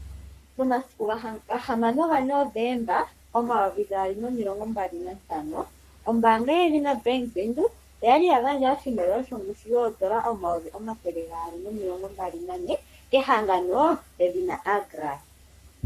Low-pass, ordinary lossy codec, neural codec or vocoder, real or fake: 14.4 kHz; Opus, 64 kbps; codec, 44.1 kHz, 3.4 kbps, Pupu-Codec; fake